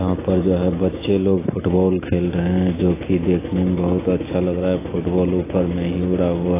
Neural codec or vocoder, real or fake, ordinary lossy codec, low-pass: none; real; AAC, 16 kbps; 3.6 kHz